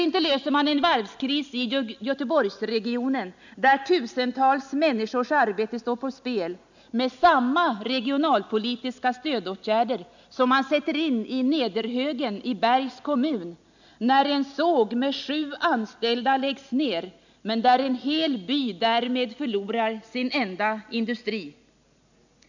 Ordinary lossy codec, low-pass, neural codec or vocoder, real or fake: none; 7.2 kHz; none; real